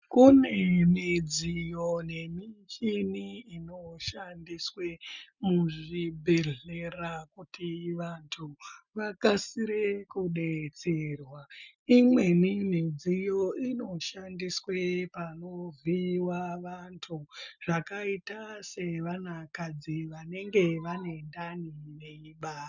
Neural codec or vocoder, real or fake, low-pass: none; real; 7.2 kHz